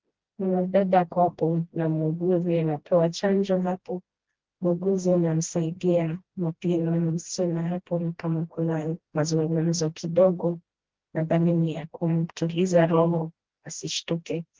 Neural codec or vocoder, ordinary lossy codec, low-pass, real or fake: codec, 16 kHz, 1 kbps, FreqCodec, smaller model; Opus, 16 kbps; 7.2 kHz; fake